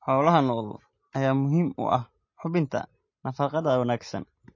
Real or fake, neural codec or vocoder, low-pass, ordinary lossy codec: real; none; 7.2 kHz; MP3, 32 kbps